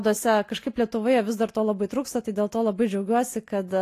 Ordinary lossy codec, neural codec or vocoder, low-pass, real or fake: AAC, 48 kbps; none; 14.4 kHz; real